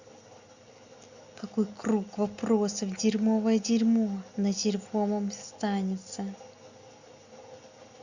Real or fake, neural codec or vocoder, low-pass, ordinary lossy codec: real; none; 7.2 kHz; Opus, 64 kbps